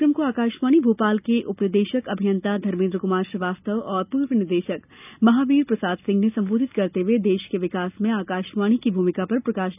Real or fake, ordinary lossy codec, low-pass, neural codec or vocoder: real; none; 3.6 kHz; none